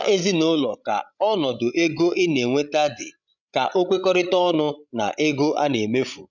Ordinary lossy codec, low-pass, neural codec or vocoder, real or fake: none; 7.2 kHz; codec, 16 kHz, 16 kbps, FreqCodec, larger model; fake